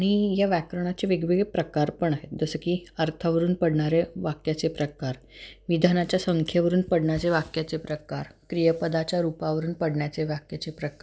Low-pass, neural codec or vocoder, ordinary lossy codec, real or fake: none; none; none; real